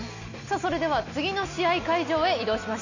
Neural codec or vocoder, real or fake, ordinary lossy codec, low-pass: none; real; none; 7.2 kHz